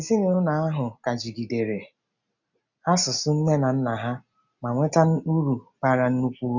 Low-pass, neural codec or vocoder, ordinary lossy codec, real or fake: 7.2 kHz; none; none; real